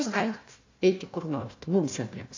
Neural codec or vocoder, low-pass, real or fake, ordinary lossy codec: codec, 16 kHz, 1 kbps, FunCodec, trained on Chinese and English, 50 frames a second; 7.2 kHz; fake; AAC, 48 kbps